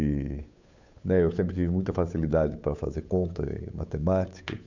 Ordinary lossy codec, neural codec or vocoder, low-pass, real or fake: none; codec, 16 kHz, 8 kbps, FunCodec, trained on Chinese and English, 25 frames a second; 7.2 kHz; fake